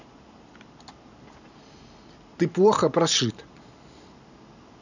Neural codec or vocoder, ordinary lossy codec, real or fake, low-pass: none; none; real; 7.2 kHz